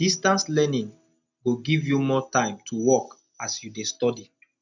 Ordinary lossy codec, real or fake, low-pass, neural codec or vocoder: none; real; 7.2 kHz; none